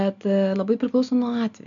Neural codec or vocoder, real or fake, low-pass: none; real; 7.2 kHz